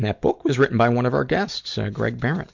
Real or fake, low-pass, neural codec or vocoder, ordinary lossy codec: real; 7.2 kHz; none; MP3, 64 kbps